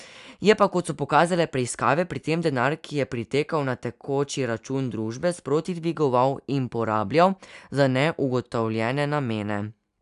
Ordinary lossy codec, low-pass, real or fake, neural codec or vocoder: none; 10.8 kHz; real; none